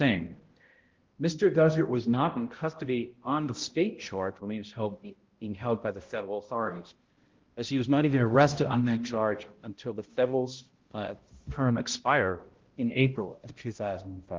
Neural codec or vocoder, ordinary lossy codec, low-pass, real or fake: codec, 16 kHz, 0.5 kbps, X-Codec, HuBERT features, trained on balanced general audio; Opus, 16 kbps; 7.2 kHz; fake